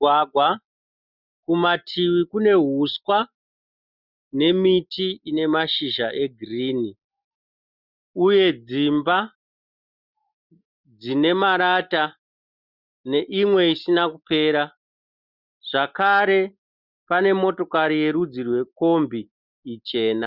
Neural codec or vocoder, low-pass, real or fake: none; 5.4 kHz; real